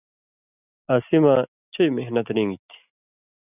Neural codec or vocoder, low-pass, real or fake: none; 3.6 kHz; real